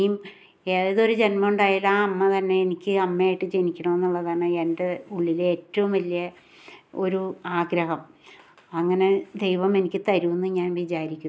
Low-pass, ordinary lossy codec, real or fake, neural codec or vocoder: none; none; real; none